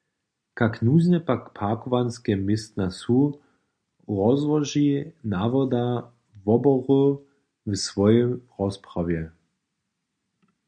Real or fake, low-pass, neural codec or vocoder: real; 9.9 kHz; none